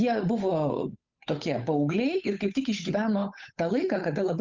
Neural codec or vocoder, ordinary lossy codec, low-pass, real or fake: codec, 16 kHz, 8 kbps, FunCodec, trained on Chinese and English, 25 frames a second; Opus, 32 kbps; 7.2 kHz; fake